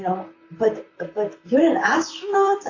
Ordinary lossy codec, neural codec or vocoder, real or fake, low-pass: AAC, 48 kbps; vocoder, 44.1 kHz, 128 mel bands, Pupu-Vocoder; fake; 7.2 kHz